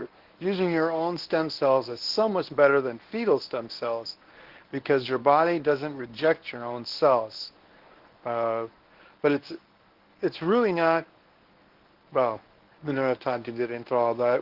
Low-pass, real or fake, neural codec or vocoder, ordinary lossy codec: 5.4 kHz; fake; codec, 24 kHz, 0.9 kbps, WavTokenizer, medium speech release version 1; Opus, 16 kbps